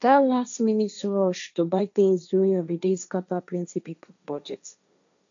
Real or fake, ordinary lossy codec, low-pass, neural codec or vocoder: fake; MP3, 96 kbps; 7.2 kHz; codec, 16 kHz, 1.1 kbps, Voila-Tokenizer